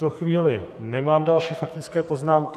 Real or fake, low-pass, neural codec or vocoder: fake; 14.4 kHz; codec, 44.1 kHz, 2.6 kbps, SNAC